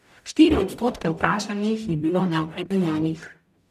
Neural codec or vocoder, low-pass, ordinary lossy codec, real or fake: codec, 44.1 kHz, 0.9 kbps, DAC; 14.4 kHz; none; fake